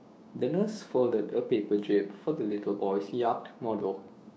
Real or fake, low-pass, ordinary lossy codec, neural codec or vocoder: fake; none; none; codec, 16 kHz, 6 kbps, DAC